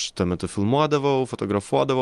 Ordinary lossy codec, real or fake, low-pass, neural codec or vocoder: Opus, 24 kbps; real; 10.8 kHz; none